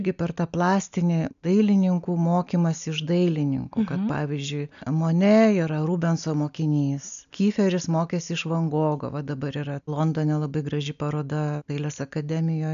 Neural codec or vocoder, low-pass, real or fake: none; 7.2 kHz; real